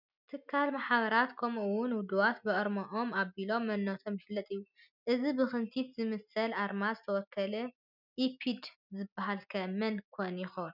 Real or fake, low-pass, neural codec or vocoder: real; 5.4 kHz; none